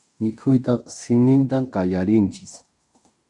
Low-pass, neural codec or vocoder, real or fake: 10.8 kHz; codec, 16 kHz in and 24 kHz out, 0.9 kbps, LongCat-Audio-Codec, fine tuned four codebook decoder; fake